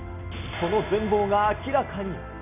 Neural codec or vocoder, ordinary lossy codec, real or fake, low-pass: none; none; real; 3.6 kHz